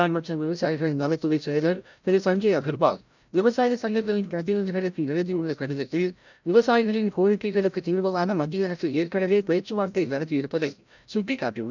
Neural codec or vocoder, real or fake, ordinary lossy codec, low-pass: codec, 16 kHz, 0.5 kbps, FreqCodec, larger model; fake; none; 7.2 kHz